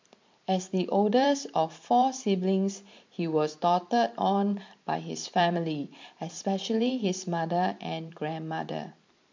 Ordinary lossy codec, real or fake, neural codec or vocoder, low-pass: MP3, 48 kbps; fake; vocoder, 44.1 kHz, 128 mel bands every 512 samples, BigVGAN v2; 7.2 kHz